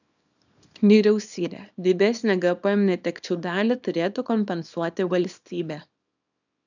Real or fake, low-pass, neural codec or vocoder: fake; 7.2 kHz; codec, 24 kHz, 0.9 kbps, WavTokenizer, small release